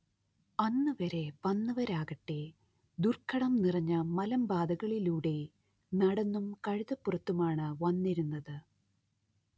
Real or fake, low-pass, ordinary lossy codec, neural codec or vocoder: real; none; none; none